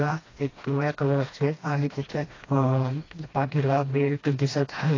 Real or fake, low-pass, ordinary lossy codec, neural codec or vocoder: fake; 7.2 kHz; AAC, 32 kbps; codec, 16 kHz, 1 kbps, FreqCodec, smaller model